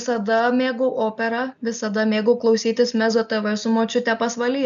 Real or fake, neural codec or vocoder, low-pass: real; none; 7.2 kHz